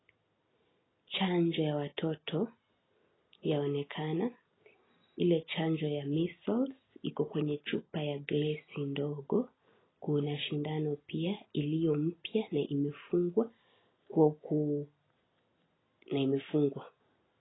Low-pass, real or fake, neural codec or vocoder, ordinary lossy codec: 7.2 kHz; real; none; AAC, 16 kbps